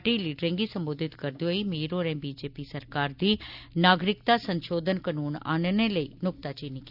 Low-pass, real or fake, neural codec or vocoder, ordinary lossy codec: 5.4 kHz; real; none; none